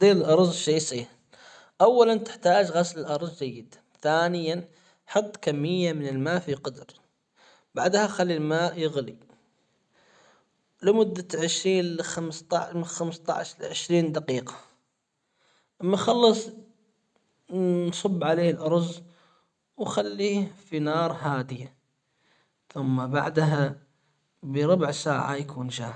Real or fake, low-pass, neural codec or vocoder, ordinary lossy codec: real; 9.9 kHz; none; none